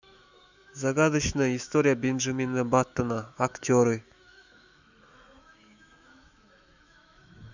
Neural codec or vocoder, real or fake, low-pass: autoencoder, 48 kHz, 128 numbers a frame, DAC-VAE, trained on Japanese speech; fake; 7.2 kHz